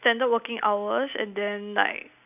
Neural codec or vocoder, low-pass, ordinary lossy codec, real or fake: none; 3.6 kHz; none; real